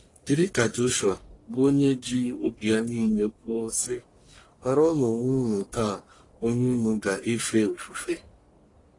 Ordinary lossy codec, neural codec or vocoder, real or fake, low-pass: AAC, 32 kbps; codec, 44.1 kHz, 1.7 kbps, Pupu-Codec; fake; 10.8 kHz